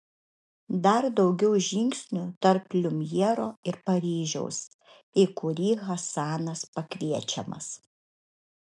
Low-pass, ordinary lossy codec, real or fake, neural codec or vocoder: 10.8 kHz; MP3, 64 kbps; real; none